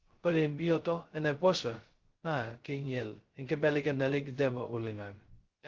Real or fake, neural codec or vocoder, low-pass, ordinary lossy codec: fake; codec, 16 kHz, 0.2 kbps, FocalCodec; 7.2 kHz; Opus, 16 kbps